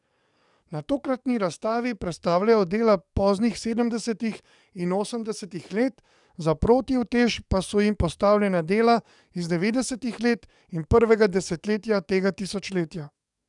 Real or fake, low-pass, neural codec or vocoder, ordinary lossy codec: fake; 10.8 kHz; codec, 44.1 kHz, 7.8 kbps, DAC; none